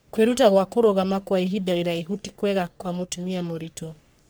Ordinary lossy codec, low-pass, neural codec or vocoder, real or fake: none; none; codec, 44.1 kHz, 3.4 kbps, Pupu-Codec; fake